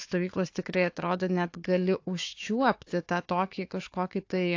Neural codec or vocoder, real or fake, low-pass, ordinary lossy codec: codec, 16 kHz, 4 kbps, FunCodec, trained on Chinese and English, 50 frames a second; fake; 7.2 kHz; AAC, 48 kbps